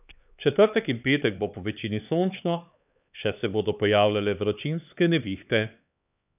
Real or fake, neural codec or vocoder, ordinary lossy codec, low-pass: fake; codec, 16 kHz, 4 kbps, X-Codec, HuBERT features, trained on LibriSpeech; none; 3.6 kHz